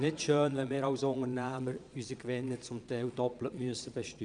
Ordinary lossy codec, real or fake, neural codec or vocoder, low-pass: none; fake; vocoder, 22.05 kHz, 80 mel bands, Vocos; 9.9 kHz